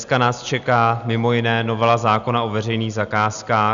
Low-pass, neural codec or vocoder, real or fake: 7.2 kHz; none; real